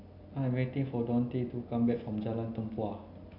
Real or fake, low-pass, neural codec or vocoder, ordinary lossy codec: real; 5.4 kHz; none; AAC, 32 kbps